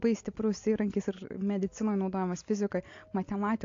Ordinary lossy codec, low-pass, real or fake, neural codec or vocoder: AAC, 48 kbps; 7.2 kHz; real; none